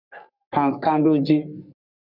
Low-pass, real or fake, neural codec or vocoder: 5.4 kHz; fake; codec, 44.1 kHz, 3.4 kbps, Pupu-Codec